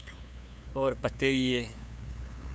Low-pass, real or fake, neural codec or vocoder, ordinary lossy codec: none; fake; codec, 16 kHz, 2 kbps, FunCodec, trained on LibriTTS, 25 frames a second; none